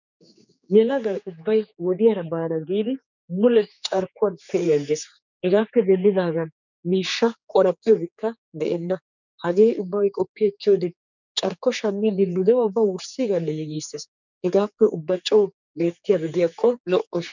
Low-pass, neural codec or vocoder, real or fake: 7.2 kHz; codec, 16 kHz, 4 kbps, X-Codec, HuBERT features, trained on general audio; fake